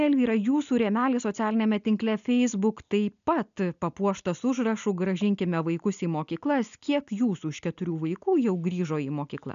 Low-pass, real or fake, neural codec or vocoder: 7.2 kHz; real; none